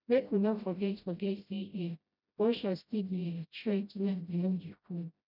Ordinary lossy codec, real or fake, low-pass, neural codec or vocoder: none; fake; 5.4 kHz; codec, 16 kHz, 0.5 kbps, FreqCodec, smaller model